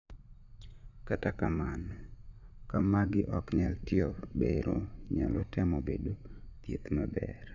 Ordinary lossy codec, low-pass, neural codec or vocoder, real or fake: none; 7.2 kHz; vocoder, 44.1 kHz, 80 mel bands, Vocos; fake